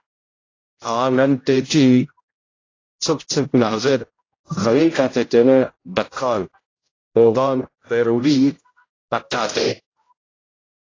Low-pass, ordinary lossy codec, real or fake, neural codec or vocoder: 7.2 kHz; AAC, 32 kbps; fake; codec, 16 kHz, 0.5 kbps, X-Codec, HuBERT features, trained on general audio